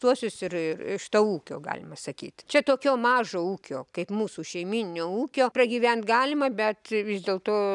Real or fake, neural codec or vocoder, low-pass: real; none; 10.8 kHz